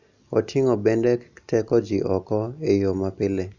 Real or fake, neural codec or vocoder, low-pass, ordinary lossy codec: real; none; 7.2 kHz; none